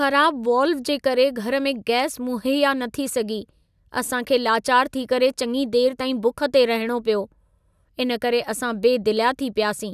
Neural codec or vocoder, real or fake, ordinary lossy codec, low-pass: none; real; none; 14.4 kHz